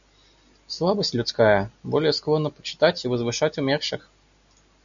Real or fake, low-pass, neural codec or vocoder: real; 7.2 kHz; none